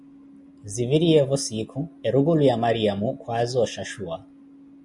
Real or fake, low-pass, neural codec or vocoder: real; 10.8 kHz; none